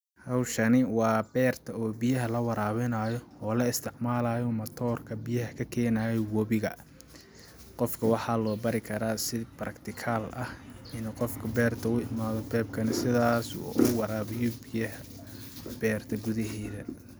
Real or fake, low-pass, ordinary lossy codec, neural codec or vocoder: real; none; none; none